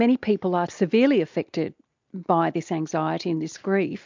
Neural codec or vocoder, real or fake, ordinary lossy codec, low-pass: none; real; MP3, 64 kbps; 7.2 kHz